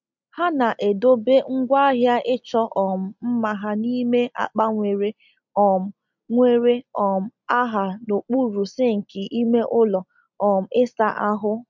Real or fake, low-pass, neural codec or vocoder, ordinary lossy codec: real; 7.2 kHz; none; MP3, 64 kbps